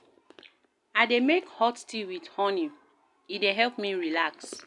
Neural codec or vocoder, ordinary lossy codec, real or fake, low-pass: none; none; real; 10.8 kHz